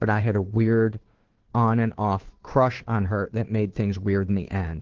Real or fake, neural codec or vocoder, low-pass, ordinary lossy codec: fake; codec, 16 kHz, 2 kbps, FunCodec, trained on Chinese and English, 25 frames a second; 7.2 kHz; Opus, 16 kbps